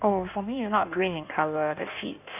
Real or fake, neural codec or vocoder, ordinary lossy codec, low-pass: fake; codec, 16 kHz in and 24 kHz out, 1.1 kbps, FireRedTTS-2 codec; none; 3.6 kHz